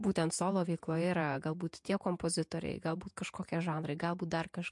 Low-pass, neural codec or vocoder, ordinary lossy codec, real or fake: 10.8 kHz; vocoder, 48 kHz, 128 mel bands, Vocos; MP3, 64 kbps; fake